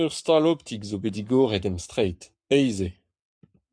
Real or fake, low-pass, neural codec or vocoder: fake; 9.9 kHz; codec, 44.1 kHz, 7.8 kbps, Pupu-Codec